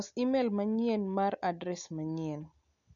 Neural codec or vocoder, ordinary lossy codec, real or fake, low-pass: none; none; real; 7.2 kHz